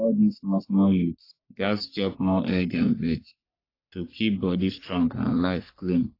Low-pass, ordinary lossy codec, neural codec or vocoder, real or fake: 5.4 kHz; AAC, 32 kbps; codec, 44.1 kHz, 3.4 kbps, Pupu-Codec; fake